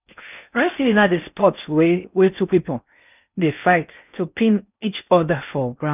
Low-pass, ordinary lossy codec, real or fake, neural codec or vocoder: 3.6 kHz; none; fake; codec, 16 kHz in and 24 kHz out, 0.6 kbps, FocalCodec, streaming, 4096 codes